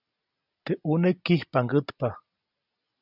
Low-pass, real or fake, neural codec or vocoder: 5.4 kHz; real; none